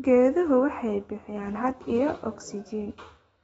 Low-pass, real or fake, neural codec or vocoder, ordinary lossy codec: 19.8 kHz; fake; autoencoder, 48 kHz, 128 numbers a frame, DAC-VAE, trained on Japanese speech; AAC, 24 kbps